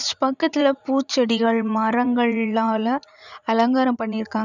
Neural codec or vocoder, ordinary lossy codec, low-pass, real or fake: vocoder, 44.1 kHz, 80 mel bands, Vocos; none; 7.2 kHz; fake